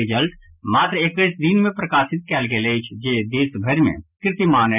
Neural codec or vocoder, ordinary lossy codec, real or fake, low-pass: none; none; real; 3.6 kHz